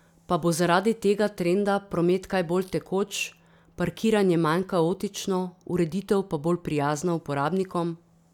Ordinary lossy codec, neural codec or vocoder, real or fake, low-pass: none; none; real; 19.8 kHz